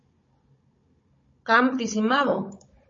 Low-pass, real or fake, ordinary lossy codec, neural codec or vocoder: 7.2 kHz; fake; MP3, 32 kbps; codec, 16 kHz, 16 kbps, FunCodec, trained on Chinese and English, 50 frames a second